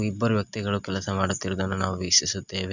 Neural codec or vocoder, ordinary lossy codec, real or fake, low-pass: none; none; real; 7.2 kHz